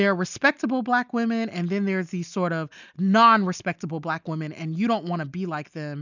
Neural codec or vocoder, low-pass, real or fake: none; 7.2 kHz; real